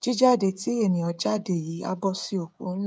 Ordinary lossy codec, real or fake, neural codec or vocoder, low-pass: none; fake; codec, 16 kHz, 16 kbps, FreqCodec, smaller model; none